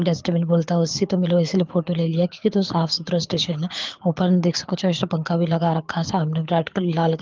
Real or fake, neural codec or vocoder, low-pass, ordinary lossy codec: fake; codec, 24 kHz, 6 kbps, HILCodec; 7.2 kHz; Opus, 32 kbps